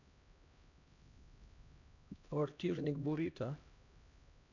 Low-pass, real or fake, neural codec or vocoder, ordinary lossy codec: 7.2 kHz; fake; codec, 16 kHz, 1 kbps, X-Codec, HuBERT features, trained on LibriSpeech; none